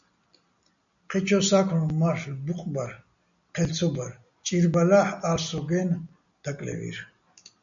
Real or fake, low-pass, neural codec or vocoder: real; 7.2 kHz; none